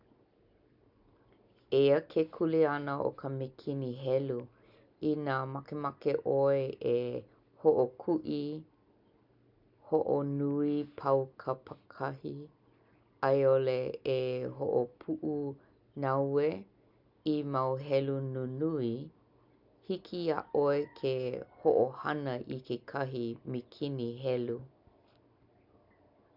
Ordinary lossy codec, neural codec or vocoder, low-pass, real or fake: none; none; 5.4 kHz; real